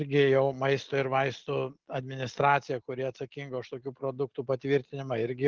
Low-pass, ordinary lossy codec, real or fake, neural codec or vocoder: 7.2 kHz; Opus, 16 kbps; real; none